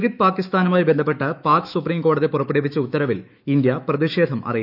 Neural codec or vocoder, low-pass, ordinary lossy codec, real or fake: autoencoder, 48 kHz, 128 numbers a frame, DAC-VAE, trained on Japanese speech; 5.4 kHz; none; fake